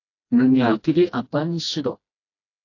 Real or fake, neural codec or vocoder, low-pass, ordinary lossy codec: fake; codec, 16 kHz, 1 kbps, FreqCodec, smaller model; 7.2 kHz; AAC, 48 kbps